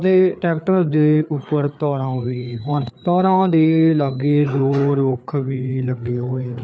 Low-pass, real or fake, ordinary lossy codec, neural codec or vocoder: none; fake; none; codec, 16 kHz, 4 kbps, FreqCodec, larger model